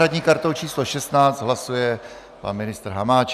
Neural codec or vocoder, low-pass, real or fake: none; 14.4 kHz; real